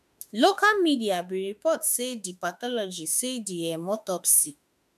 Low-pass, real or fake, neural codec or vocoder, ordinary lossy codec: 14.4 kHz; fake; autoencoder, 48 kHz, 32 numbers a frame, DAC-VAE, trained on Japanese speech; none